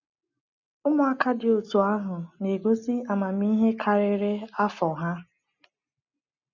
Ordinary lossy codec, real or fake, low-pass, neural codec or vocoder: none; real; 7.2 kHz; none